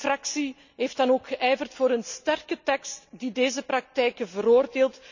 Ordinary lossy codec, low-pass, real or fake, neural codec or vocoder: none; 7.2 kHz; real; none